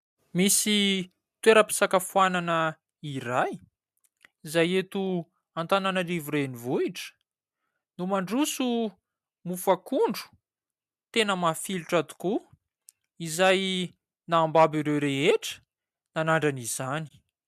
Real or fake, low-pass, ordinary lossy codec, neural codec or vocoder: real; 14.4 kHz; MP3, 96 kbps; none